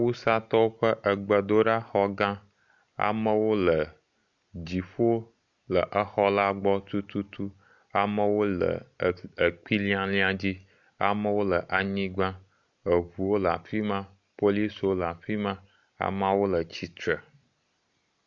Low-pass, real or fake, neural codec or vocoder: 7.2 kHz; real; none